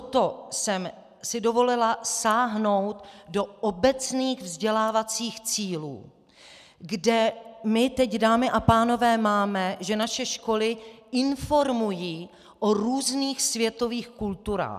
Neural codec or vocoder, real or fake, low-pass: none; real; 14.4 kHz